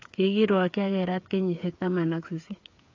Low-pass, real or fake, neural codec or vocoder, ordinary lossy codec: 7.2 kHz; fake; codec, 16 kHz, 8 kbps, FreqCodec, smaller model; AAC, 48 kbps